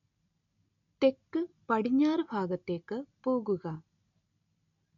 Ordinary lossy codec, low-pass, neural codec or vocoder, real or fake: none; 7.2 kHz; none; real